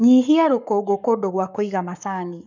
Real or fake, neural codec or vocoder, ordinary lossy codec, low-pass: fake; codec, 44.1 kHz, 7.8 kbps, Pupu-Codec; none; 7.2 kHz